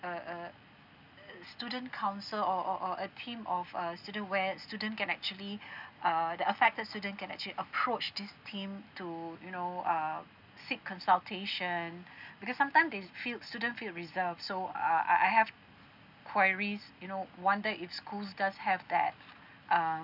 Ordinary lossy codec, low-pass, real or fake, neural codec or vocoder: none; 5.4 kHz; real; none